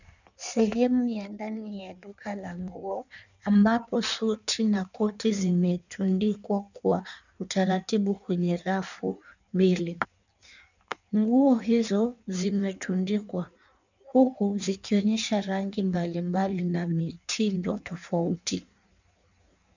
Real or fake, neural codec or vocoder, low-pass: fake; codec, 16 kHz in and 24 kHz out, 1.1 kbps, FireRedTTS-2 codec; 7.2 kHz